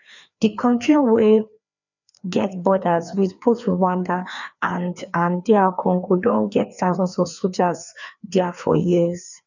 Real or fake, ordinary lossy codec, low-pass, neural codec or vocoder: fake; none; 7.2 kHz; codec, 16 kHz, 2 kbps, FreqCodec, larger model